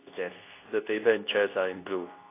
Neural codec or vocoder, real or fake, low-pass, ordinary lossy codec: codec, 24 kHz, 0.9 kbps, WavTokenizer, medium speech release version 2; fake; 3.6 kHz; AAC, 24 kbps